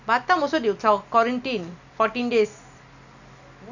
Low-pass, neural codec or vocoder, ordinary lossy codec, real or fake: 7.2 kHz; none; none; real